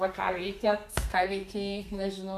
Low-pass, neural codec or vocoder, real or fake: 14.4 kHz; codec, 32 kHz, 1.9 kbps, SNAC; fake